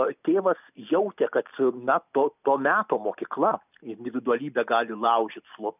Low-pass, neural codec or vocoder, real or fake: 3.6 kHz; none; real